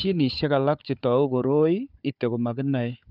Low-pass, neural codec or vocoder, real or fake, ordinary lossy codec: 5.4 kHz; codec, 16 kHz, 4 kbps, FunCodec, trained on Chinese and English, 50 frames a second; fake; none